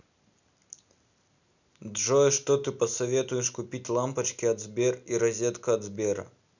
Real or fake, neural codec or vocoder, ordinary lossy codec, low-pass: real; none; none; 7.2 kHz